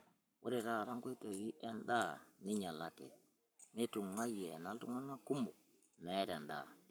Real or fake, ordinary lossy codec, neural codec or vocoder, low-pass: fake; none; codec, 44.1 kHz, 7.8 kbps, Pupu-Codec; none